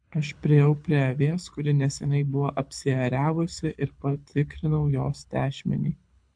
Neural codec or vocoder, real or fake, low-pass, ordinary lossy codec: codec, 24 kHz, 6 kbps, HILCodec; fake; 9.9 kHz; MP3, 64 kbps